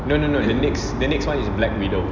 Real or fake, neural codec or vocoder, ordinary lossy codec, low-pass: real; none; none; 7.2 kHz